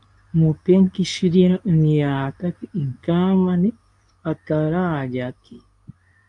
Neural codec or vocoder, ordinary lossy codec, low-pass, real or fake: codec, 24 kHz, 0.9 kbps, WavTokenizer, medium speech release version 2; AAC, 64 kbps; 10.8 kHz; fake